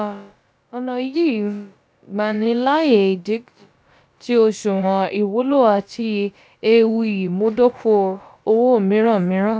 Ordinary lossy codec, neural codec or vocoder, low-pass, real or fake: none; codec, 16 kHz, about 1 kbps, DyCAST, with the encoder's durations; none; fake